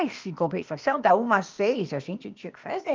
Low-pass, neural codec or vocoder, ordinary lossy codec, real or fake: 7.2 kHz; codec, 16 kHz, 0.8 kbps, ZipCodec; Opus, 24 kbps; fake